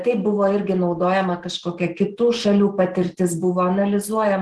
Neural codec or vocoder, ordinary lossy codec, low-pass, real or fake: none; Opus, 16 kbps; 10.8 kHz; real